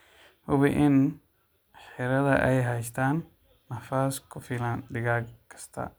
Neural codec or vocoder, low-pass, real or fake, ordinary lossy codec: none; none; real; none